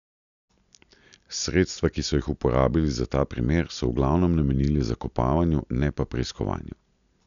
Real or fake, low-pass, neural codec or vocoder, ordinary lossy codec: real; 7.2 kHz; none; none